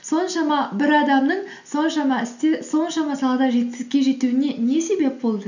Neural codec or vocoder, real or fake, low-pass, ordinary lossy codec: none; real; 7.2 kHz; none